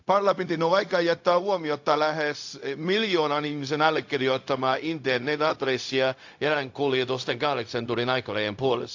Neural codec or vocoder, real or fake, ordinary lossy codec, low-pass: codec, 16 kHz, 0.4 kbps, LongCat-Audio-Codec; fake; AAC, 48 kbps; 7.2 kHz